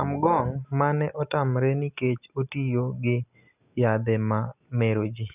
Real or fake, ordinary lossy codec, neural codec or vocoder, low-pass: real; none; none; 3.6 kHz